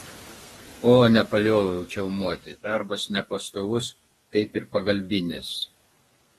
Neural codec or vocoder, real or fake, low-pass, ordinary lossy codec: codec, 32 kHz, 1.9 kbps, SNAC; fake; 14.4 kHz; AAC, 32 kbps